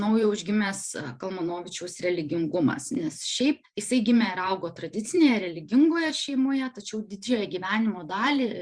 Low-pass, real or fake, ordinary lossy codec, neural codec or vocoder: 9.9 kHz; fake; Opus, 64 kbps; vocoder, 44.1 kHz, 128 mel bands every 512 samples, BigVGAN v2